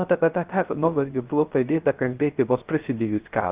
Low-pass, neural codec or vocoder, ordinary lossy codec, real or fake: 3.6 kHz; codec, 16 kHz, 0.3 kbps, FocalCodec; Opus, 32 kbps; fake